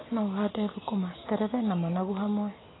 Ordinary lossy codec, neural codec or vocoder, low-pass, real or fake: AAC, 16 kbps; none; 7.2 kHz; real